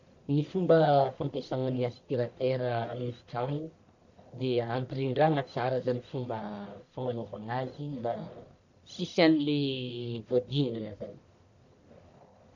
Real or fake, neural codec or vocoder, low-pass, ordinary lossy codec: fake; codec, 44.1 kHz, 1.7 kbps, Pupu-Codec; 7.2 kHz; none